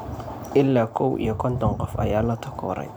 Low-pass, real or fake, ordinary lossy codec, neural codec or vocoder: none; real; none; none